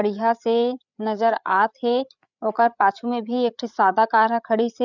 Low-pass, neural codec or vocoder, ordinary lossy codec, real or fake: 7.2 kHz; codec, 16 kHz, 8 kbps, FreqCodec, larger model; none; fake